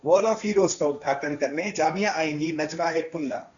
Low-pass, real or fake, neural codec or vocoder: 7.2 kHz; fake; codec, 16 kHz, 1.1 kbps, Voila-Tokenizer